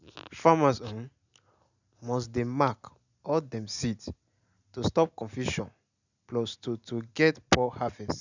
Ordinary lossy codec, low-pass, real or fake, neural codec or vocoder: none; 7.2 kHz; real; none